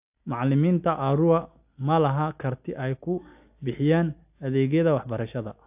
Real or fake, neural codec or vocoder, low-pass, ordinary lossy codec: real; none; 3.6 kHz; AAC, 32 kbps